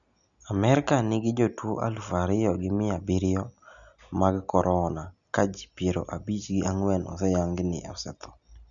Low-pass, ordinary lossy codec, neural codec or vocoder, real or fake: 7.2 kHz; none; none; real